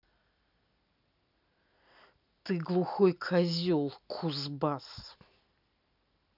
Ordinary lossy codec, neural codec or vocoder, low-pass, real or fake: none; none; 5.4 kHz; real